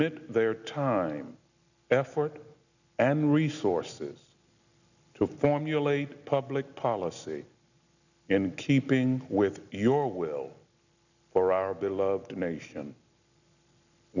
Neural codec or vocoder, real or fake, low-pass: none; real; 7.2 kHz